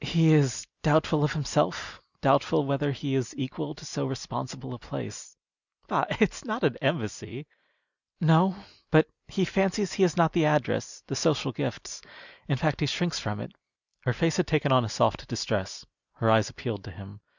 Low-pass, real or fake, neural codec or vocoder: 7.2 kHz; real; none